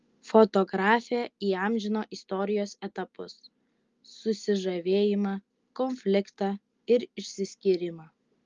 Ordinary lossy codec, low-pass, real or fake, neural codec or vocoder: Opus, 32 kbps; 7.2 kHz; real; none